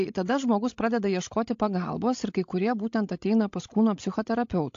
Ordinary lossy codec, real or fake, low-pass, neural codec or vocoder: AAC, 48 kbps; fake; 7.2 kHz; codec, 16 kHz, 16 kbps, FreqCodec, larger model